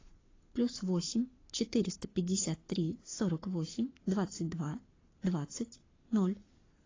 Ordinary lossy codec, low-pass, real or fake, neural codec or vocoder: AAC, 32 kbps; 7.2 kHz; fake; codec, 44.1 kHz, 7.8 kbps, Pupu-Codec